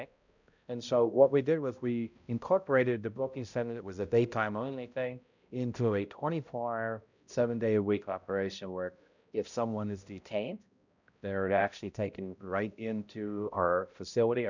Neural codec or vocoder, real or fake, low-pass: codec, 16 kHz, 0.5 kbps, X-Codec, HuBERT features, trained on balanced general audio; fake; 7.2 kHz